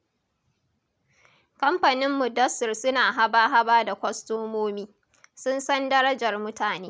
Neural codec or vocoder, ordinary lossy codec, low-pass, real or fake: none; none; none; real